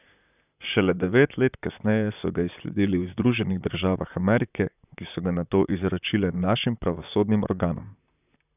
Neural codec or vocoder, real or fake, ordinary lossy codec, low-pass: vocoder, 44.1 kHz, 128 mel bands, Pupu-Vocoder; fake; none; 3.6 kHz